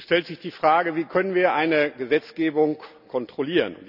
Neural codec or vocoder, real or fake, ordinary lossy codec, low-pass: none; real; none; 5.4 kHz